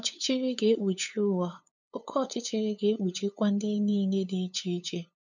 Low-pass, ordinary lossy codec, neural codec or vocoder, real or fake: 7.2 kHz; none; codec, 16 kHz, 8 kbps, FunCodec, trained on LibriTTS, 25 frames a second; fake